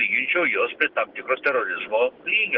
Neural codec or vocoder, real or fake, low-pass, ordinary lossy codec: none; real; 5.4 kHz; Opus, 16 kbps